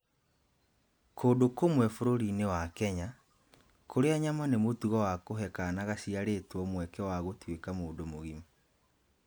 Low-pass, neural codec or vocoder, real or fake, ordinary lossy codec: none; none; real; none